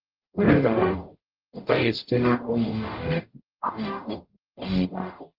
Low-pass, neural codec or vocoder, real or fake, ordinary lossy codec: 5.4 kHz; codec, 44.1 kHz, 0.9 kbps, DAC; fake; Opus, 32 kbps